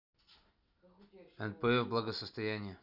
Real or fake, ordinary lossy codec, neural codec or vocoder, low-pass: real; none; none; 5.4 kHz